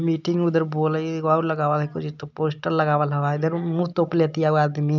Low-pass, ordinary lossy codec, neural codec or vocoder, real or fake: 7.2 kHz; none; none; real